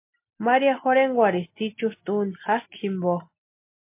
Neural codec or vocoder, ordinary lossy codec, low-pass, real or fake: none; MP3, 24 kbps; 3.6 kHz; real